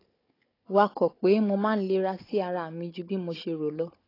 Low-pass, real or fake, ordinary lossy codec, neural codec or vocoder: 5.4 kHz; fake; AAC, 24 kbps; codec, 16 kHz, 16 kbps, FunCodec, trained on Chinese and English, 50 frames a second